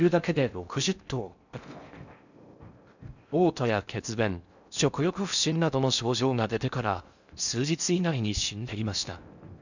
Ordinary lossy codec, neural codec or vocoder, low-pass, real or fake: none; codec, 16 kHz in and 24 kHz out, 0.6 kbps, FocalCodec, streaming, 4096 codes; 7.2 kHz; fake